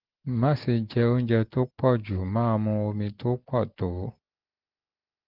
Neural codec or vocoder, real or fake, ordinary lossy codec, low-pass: none; real; Opus, 16 kbps; 5.4 kHz